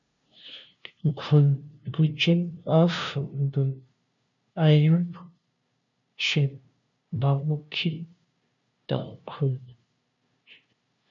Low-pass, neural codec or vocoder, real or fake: 7.2 kHz; codec, 16 kHz, 0.5 kbps, FunCodec, trained on LibriTTS, 25 frames a second; fake